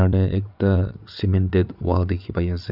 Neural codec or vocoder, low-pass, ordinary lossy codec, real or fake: vocoder, 22.05 kHz, 80 mel bands, WaveNeXt; 5.4 kHz; none; fake